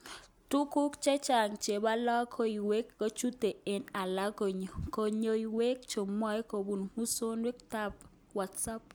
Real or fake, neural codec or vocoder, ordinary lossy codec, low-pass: real; none; none; none